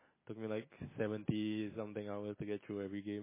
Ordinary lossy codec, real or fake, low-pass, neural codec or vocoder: MP3, 16 kbps; real; 3.6 kHz; none